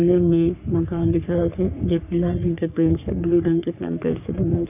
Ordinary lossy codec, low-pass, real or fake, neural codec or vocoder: none; 3.6 kHz; fake; codec, 44.1 kHz, 3.4 kbps, Pupu-Codec